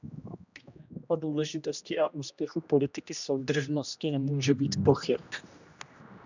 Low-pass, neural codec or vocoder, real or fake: 7.2 kHz; codec, 16 kHz, 1 kbps, X-Codec, HuBERT features, trained on general audio; fake